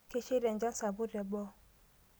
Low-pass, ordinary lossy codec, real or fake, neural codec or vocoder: none; none; real; none